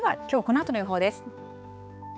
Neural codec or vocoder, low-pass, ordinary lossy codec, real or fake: codec, 16 kHz, 4 kbps, X-Codec, HuBERT features, trained on balanced general audio; none; none; fake